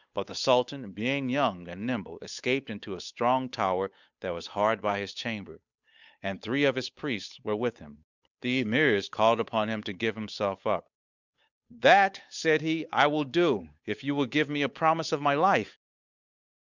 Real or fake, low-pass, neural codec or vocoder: fake; 7.2 kHz; codec, 16 kHz, 8 kbps, FunCodec, trained on Chinese and English, 25 frames a second